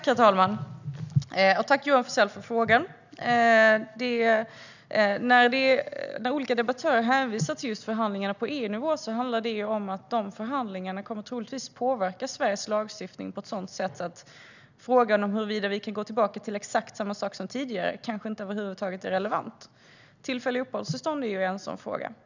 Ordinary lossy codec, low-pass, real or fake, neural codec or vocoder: none; 7.2 kHz; real; none